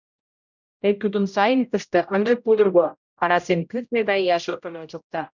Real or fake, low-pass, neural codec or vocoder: fake; 7.2 kHz; codec, 16 kHz, 0.5 kbps, X-Codec, HuBERT features, trained on general audio